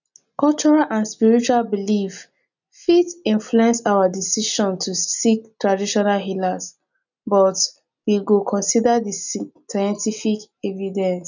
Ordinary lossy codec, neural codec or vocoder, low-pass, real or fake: none; none; 7.2 kHz; real